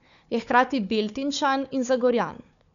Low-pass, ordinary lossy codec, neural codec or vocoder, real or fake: 7.2 kHz; none; codec, 16 kHz, 4 kbps, FunCodec, trained on Chinese and English, 50 frames a second; fake